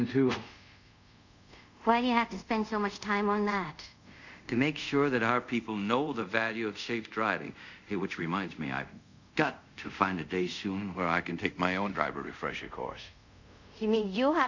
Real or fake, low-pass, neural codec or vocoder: fake; 7.2 kHz; codec, 24 kHz, 0.5 kbps, DualCodec